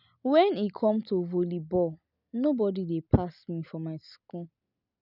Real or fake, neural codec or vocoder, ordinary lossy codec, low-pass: real; none; none; 5.4 kHz